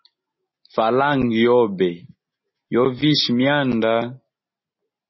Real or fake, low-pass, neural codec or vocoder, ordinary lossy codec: real; 7.2 kHz; none; MP3, 24 kbps